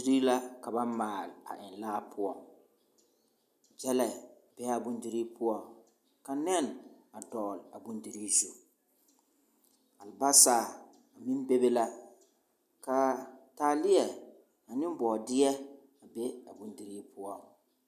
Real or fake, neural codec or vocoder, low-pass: real; none; 14.4 kHz